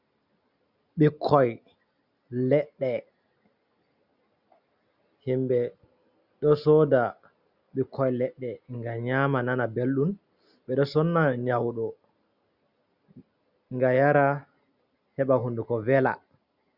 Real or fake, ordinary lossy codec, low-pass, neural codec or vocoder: real; Opus, 64 kbps; 5.4 kHz; none